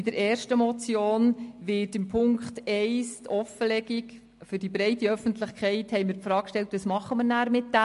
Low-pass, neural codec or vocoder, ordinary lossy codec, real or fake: 14.4 kHz; none; MP3, 48 kbps; real